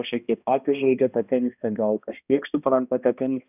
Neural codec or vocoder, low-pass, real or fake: codec, 16 kHz, 1 kbps, X-Codec, HuBERT features, trained on balanced general audio; 3.6 kHz; fake